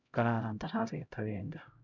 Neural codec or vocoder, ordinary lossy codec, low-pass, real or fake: codec, 16 kHz, 0.5 kbps, X-Codec, HuBERT features, trained on LibriSpeech; none; 7.2 kHz; fake